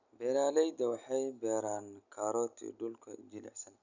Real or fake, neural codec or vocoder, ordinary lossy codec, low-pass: real; none; none; 7.2 kHz